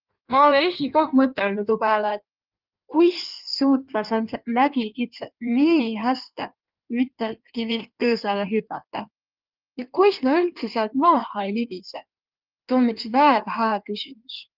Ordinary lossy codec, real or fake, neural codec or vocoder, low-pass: Opus, 32 kbps; fake; codec, 16 kHz in and 24 kHz out, 1.1 kbps, FireRedTTS-2 codec; 5.4 kHz